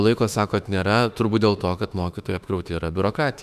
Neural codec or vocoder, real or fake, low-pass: autoencoder, 48 kHz, 32 numbers a frame, DAC-VAE, trained on Japanese speech; fake; 14.4 kHz